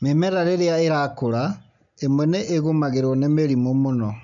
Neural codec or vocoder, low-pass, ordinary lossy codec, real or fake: none; 7.2 kHz; none; real